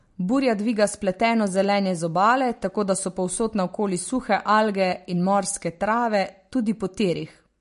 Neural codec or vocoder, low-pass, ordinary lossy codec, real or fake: none; 10.8 kHz; MP3, 48 kbps; real